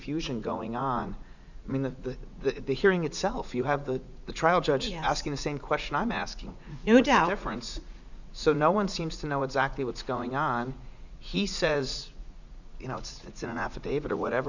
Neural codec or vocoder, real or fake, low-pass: vocoder, 44.1 kHz, 80 mel bands, Vocos; fake; 7.2 kHz